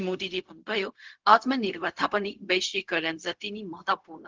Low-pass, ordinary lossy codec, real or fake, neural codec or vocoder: 7.2 kHz; Opus, 16 kbps; fake; codec, 16 kHz, 0.4 kbps, LongCat-Audio-Codec